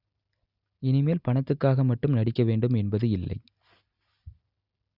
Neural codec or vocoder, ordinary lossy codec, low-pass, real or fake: none; Opus, 64 kbps; 5.4 kHz; real